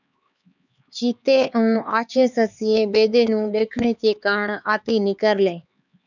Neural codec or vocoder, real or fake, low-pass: codec, 16 kHz, 4 kbps, X-Codec, HuBERT features, trained on LibriSpeech; fake; 7.2 kHz